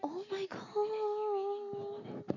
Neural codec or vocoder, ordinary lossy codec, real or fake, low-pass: none; none; real; 7.2 kHz